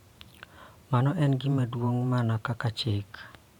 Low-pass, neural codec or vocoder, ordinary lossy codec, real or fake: 19.8 kHz; vocoder, 44.1 kHz, 128 mel bands every 512 samples, BigVGAN v2; none; fake